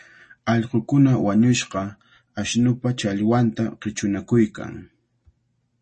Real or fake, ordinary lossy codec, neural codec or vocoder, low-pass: real; MP3, 32 kbps; none; 10.8 kHz